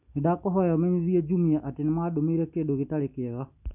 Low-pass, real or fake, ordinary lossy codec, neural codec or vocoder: 3.6 kHz; real; none; none